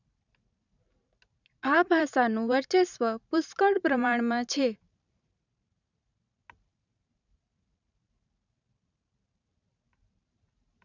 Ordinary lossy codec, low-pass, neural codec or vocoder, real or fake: none; 7.2 kHz; vocoder, 44.1 kHz, 128 mel bands every 512 samples, BigVGAN v2; fake